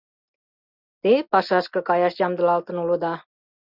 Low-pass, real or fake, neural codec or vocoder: 5.4 kHz; real; none